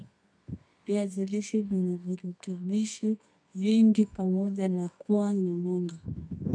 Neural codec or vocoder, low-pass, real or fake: codec, 24 kHz, 0.9 kbps, WavTokenizer, medium music audio release; 9.9 kHz; fake